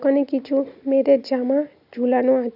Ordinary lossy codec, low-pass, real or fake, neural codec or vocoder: AAC, 48 kbps; 5.4 kHz; real; none